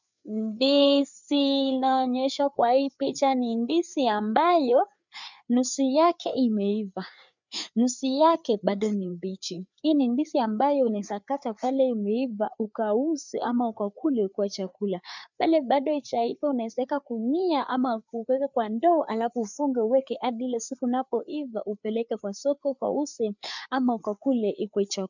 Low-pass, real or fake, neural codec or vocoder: 7.2 kHz; fake; codec, 16 kHz, 4 kbps, FreqCodec, larger model